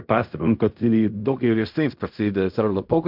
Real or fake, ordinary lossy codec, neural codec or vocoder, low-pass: fake; MP3, 48 kbps; codec, 16 kHz in and 24 kHz out, 0.4 kbps, LongCat-Audio-Codec, fine tuned four codebook decoder; 5.4 kHz